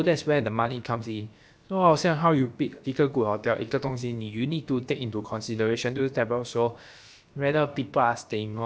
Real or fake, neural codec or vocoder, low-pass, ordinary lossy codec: fake; codec, 16 kHz, about 1 kbps, DyCAST, with the encoder's durations; none; none